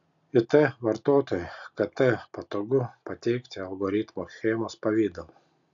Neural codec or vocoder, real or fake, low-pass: none; real; 7.2 kHz